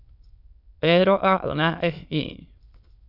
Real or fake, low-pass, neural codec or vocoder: fake; 5.4 kHz; autoencoder, 22.05 kHz, a latent of 192 numbers a frame, VITS, trained on many speakers